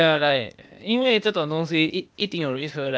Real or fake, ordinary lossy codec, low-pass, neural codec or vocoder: fake; none; none; codec, 16 kHz, 0.8 kbps, ZipCodec